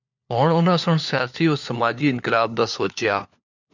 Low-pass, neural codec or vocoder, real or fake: 7.2 kHz; codec, 16 kHz, 4 kbps, FunCodec, trained on LibriTTS, 50 frames a second; fake